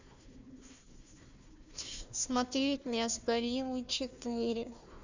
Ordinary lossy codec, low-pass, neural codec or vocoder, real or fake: Opus, 64 kbps; 7.2 kHz; codec, 16 kHz, 1 kbps, FunCodec, trained on Chinese and English, 50 frames a second; fake